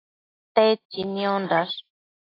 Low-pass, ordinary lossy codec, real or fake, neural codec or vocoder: 5.4 kHz; AAC, 24 kbps; real; none